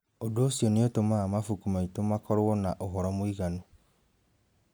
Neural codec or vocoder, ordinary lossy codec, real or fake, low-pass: none; none; real; none